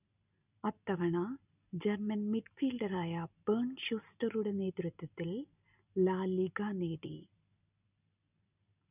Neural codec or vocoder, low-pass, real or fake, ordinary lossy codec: none; 3.6 kHz; real; none